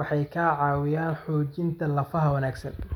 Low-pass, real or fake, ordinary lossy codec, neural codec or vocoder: 19.8 kHz; real; none; none